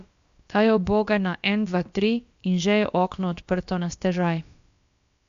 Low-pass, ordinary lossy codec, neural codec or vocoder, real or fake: 7.2 kHz; MP3, 64 kbps; codec, 16 kHz, about 1 kbps, DyCAST, with the encoder's durations; fake